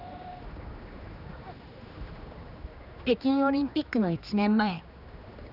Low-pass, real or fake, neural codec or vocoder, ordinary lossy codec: 5.4 kHz; fake; codec, 16 kHz, 2 kbps, X-Codec, HuBERT features, trained on general audio; none